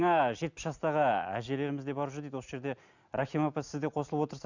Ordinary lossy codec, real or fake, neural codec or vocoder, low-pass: none; real; none; 7.2 kHz